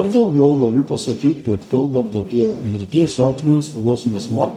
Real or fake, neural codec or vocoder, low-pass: fake; codec, 44.1 kHz, 0.9 kbps, DAC; 19.8 kHz